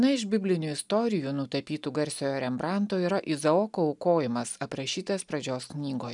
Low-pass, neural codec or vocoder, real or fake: 10.8 kHz; none; real